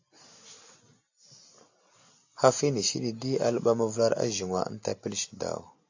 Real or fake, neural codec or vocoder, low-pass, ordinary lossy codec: real; none; 7.2 kHz; AAC, 48 kbps